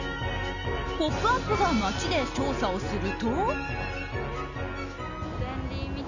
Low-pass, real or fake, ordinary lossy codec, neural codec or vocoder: 7.2 kHz; real; none; none